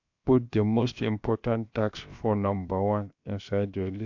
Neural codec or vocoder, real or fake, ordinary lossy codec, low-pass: codec, 16 kHz, 0.7 kbps, FocalCodec; fake; AAC, 48 kbps; 7.2 kHz